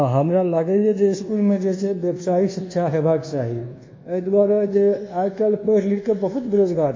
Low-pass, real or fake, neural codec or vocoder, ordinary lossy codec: 7.2 kHz; fake; codec, 24 kHz, 1.2 kbps, DualCodec; MP3, 32 kbps